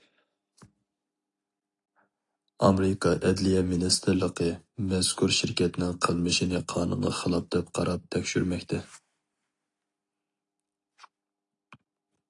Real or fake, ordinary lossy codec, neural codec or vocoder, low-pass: real; AAC, 48 kbps; none; 10.8 kHz